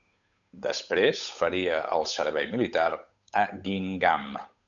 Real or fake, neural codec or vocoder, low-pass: fake; codec, 16 kHz, 8 kbps, FunCodec, trained on Chinese and English, 25 frames a second; 7.2 kHz